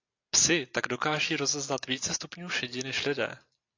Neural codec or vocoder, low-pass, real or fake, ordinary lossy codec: none; 7.2 kHz; real; AAC, 48 kbps